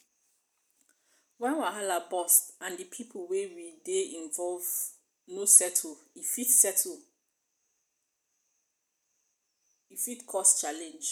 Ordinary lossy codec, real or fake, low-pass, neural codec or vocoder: none; real; none; none